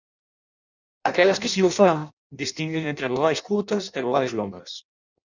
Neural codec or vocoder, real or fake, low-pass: codec, 16 kHz in and 24 kHz out, 0.6 kbps, FireRedTTS-2 codec; fake; 7.2 kHz